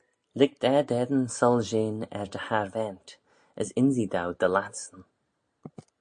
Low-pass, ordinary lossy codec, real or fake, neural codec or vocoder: 9.9 kHz; MP3, 48 kbps; real; none